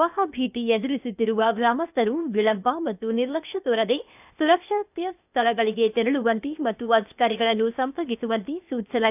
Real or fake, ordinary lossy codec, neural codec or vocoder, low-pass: fake; none; codec, 16 kHz, 0.8 kbps, ZipCodec; 3.6 kHz